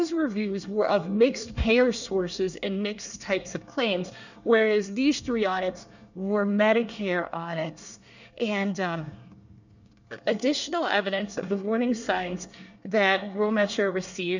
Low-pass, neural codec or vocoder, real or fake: 7.2 kHz; codec, 24 kHz, 1 kbps, SNAC; fake